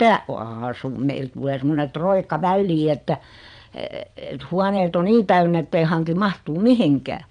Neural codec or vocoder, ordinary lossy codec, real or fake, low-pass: vocoder, 22.05 kHz, 80 mel bands, Vocos; none; fake; 9.9 kHz